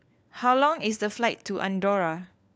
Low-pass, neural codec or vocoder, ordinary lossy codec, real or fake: none; codec, 16 kHz, 4 kbps, FunCodec, trained on LibriTTS, 50 frames a second; none; fake